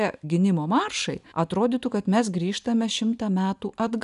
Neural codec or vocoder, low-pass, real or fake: none; 10.8 kHz; real